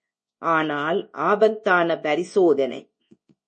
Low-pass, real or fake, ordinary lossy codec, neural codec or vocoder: 10.8 kHz; fake; MP3, 32 kbps; codec, 24 kHz, 0.9 kbps, WavTokenizer, large speech release